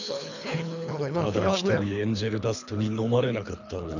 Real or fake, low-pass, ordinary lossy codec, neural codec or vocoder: fake; 7.2 kHz; none; codec, 24 kHz, 3 kbps, HILCodec